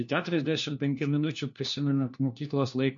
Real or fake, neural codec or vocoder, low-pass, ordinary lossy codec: fake; codec, 16 kHz, 1 kbps, FunCodec, trained on LibriTTS, 50 frames a second; 7.2 kHz; MP3, 48 kbps